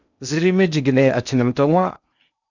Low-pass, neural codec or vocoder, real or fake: 7.2 kHz; codec, 16 kHz in and 24 kHz out, 0.6 kbps, FocalCodec, streaming, 2048 codes; fake